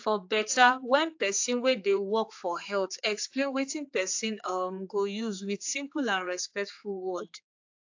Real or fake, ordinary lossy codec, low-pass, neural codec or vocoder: fake; AAC, 48 kbps; 7.2 kHz; codec, 16 kHz, 4 kbps, X-Codec, HuBERT features, trained on general audio